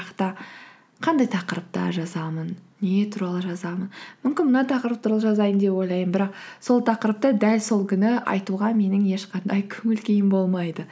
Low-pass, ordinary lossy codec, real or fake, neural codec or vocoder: none; none; real; none